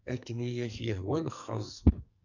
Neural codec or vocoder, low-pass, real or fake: codec, 44.1 kHz, 2.6 kbps, SNAC; 7.2 kHz; fake